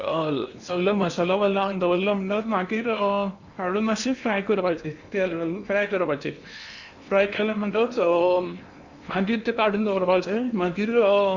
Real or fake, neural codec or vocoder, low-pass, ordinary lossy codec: fake; codec, 16 kHz in and 24 kHz out, 0.8 kbps, FocalCodec, streaming, 65536 codes; 7.2 kHz; Opus, 64 kbps